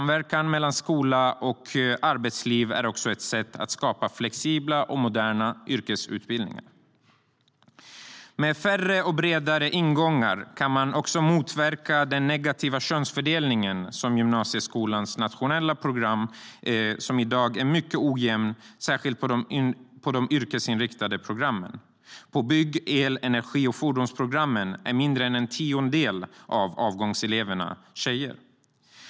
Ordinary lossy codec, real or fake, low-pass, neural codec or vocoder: none; real; none; none